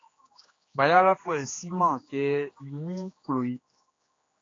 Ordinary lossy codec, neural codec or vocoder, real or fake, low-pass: AAC, 32 kbps; codec, 16 kHz, 2 kbps, X-Codec, HuBERT features, trained on general audio; fake; 7.2 kHz